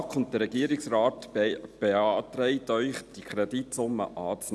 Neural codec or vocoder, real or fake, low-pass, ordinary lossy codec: none; real; none; none